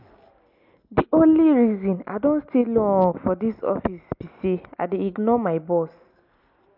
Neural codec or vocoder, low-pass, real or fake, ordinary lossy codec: none; 5.4 kHz; real; MP3, 48 kbps